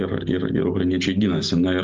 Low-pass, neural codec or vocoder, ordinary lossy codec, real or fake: 7.2 kHz; none; Opus, 32 kbps; real